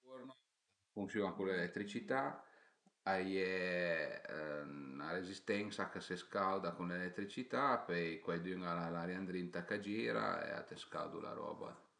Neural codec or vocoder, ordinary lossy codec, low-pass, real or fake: none; none; 9.9 kHz; real